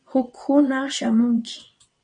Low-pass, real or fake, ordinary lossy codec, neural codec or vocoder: 9.9 kHz; fake; MP3, 48 kbps; vocoder, 22.05 kHz, 80 mel bands, WaveNeXt